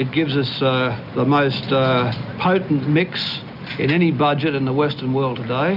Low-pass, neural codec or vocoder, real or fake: 5.4 kHz; none; real